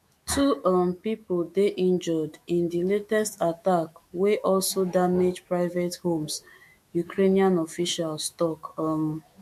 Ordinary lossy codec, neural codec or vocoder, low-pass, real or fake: MP3, 64 kbps; autoencoder, 48 kHz, 128 numbers a frame, DAC-VAE, trained on Japanese speech; 14.4 kHz; fake